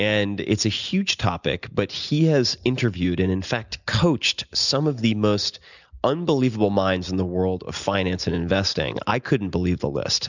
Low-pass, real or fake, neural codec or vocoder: 7.2 kHz; real; none